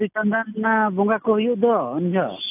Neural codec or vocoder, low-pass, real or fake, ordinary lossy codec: none; 3.6 kHz; real; AAC, 32 kbps